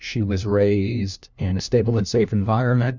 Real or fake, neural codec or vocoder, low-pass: fake; codec, 16 kHz, 1 kbps, FunCodec, trained on LibriTTS, 50 frames a second; 7.2 kHz